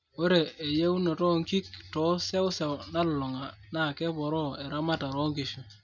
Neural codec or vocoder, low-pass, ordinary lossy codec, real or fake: none; 7.2 kHz; none; real